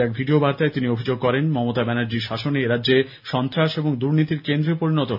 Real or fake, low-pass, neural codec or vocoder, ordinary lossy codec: real; 5.4 kHz; none; none